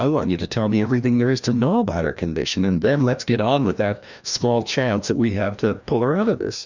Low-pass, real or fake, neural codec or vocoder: 7.2 kHz; fake; codec, 16 kHz, 1 kbps, FreqCodec, larger model